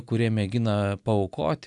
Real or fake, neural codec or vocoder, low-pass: real; none; 10.8 kHz